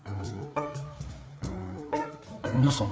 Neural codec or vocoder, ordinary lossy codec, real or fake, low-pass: codec, 16 kHz, 4 kbps, FreqCodec, larger model; none; fake; none